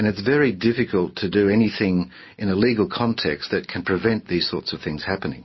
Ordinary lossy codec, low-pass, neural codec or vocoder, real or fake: MP3, 24 kbps; 7.2 kHz; none; real